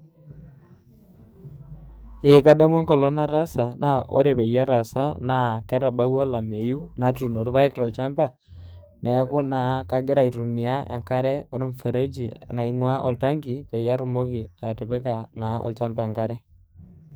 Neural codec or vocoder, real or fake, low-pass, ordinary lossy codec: codec, 44.1 kHz, 2.6 kbps, SNAC; fake; none; none